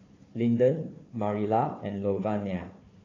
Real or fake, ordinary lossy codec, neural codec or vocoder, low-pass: fake; AAC, 32 kbps; codec, 16 kHz, 4 kbps, FunCodec, trained on Chinese and English, 50 frames a second; 7.2 kHz